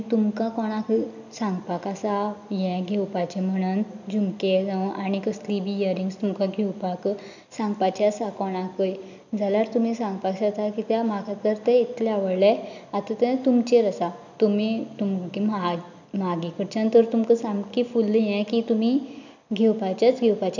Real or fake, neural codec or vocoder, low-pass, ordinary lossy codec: real; none; 7.2 kHz; none